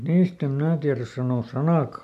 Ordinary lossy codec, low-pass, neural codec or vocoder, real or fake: none; 14.4 kHz; none; real